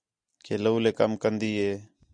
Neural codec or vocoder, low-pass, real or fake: none; 9.9 kHz; real